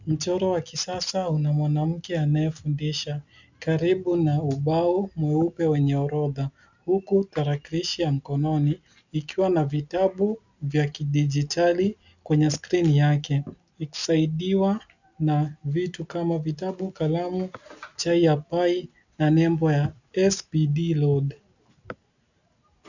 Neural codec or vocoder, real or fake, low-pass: none; real; 7.2 kHz